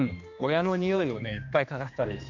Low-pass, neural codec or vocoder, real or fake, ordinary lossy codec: 7.2 kHz; codec, 16 kHz, 2 kbps, X-Codec, HuBERT features, trained on general audio; fake; none